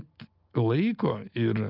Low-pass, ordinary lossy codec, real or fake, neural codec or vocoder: 5.4 kHz; Opus, 32 kbps; real; none